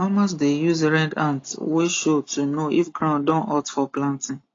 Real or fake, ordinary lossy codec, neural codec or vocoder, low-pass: real; AAC, 32 kbps; none; 7.2 kHz